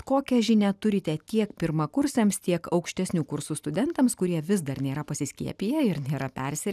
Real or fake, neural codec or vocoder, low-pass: real; none; 14.4 kHz